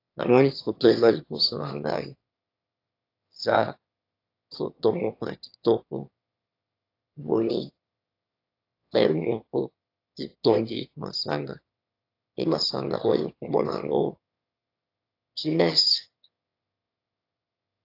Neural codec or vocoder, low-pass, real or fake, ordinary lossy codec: autoencoder, 22.05 kHz, a latent of 192 numbers a frame, VITS, trained on one speaker; 5.4 kHz; fake; AAC, 32 kbps